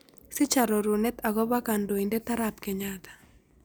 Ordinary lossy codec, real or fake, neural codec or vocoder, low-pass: none; real; none; none